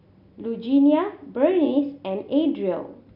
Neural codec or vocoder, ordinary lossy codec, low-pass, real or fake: none; none; 5.4 kHz; real